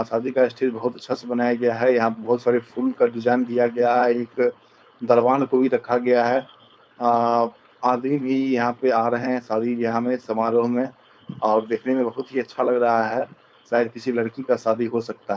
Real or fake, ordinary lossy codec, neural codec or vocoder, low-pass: fake; none; codec, 16 kHz, 4.8 kbps, FACodec; none